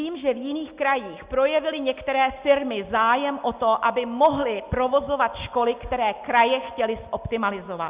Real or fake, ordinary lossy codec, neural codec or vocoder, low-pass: real; Opus, 64 kbps; none; 3.6 kHz